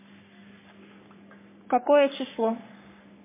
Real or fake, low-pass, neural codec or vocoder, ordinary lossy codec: fake; 3.6 kHz; codec, 44.1 kHz, 3.4 kbps, Pupu-Codec; MP3, 16 kbps